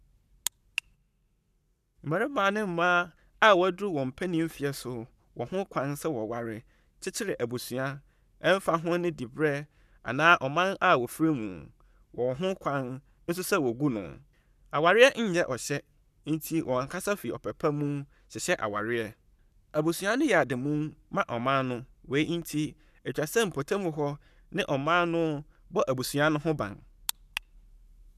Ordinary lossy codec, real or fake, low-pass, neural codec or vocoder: none; fake; 14.4 kHz; codec, 44.1 kHz, 7.8 kbps, Pupu-Codec